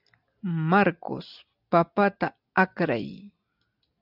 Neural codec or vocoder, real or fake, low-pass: none; real; 5.4 kHz